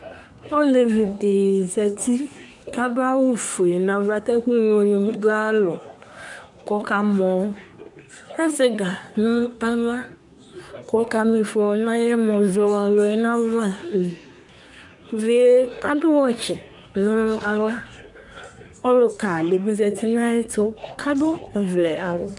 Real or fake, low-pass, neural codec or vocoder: fake; 10.8 kHz; codec, 24 kHz, 1 kbps, SNAC